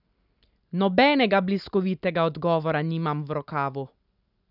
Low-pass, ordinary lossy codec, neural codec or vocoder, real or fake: 5.4 kHz; none; none; real